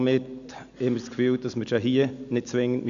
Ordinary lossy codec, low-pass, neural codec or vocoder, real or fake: none; 7.2 kHz; none; real